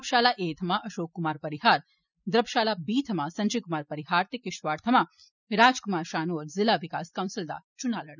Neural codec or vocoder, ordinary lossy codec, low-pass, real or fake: none; none; 7.2 kHz; real